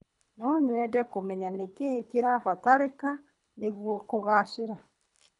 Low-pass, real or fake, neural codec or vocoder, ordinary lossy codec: 10.8 kHz; fake; codec, 24 kHz, 3 kbps, HILCodec; none